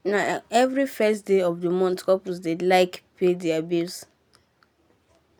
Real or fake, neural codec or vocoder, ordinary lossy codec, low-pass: real; none; none; none